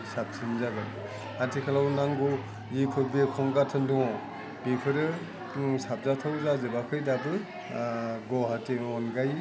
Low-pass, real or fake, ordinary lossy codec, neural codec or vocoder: none; real; none; none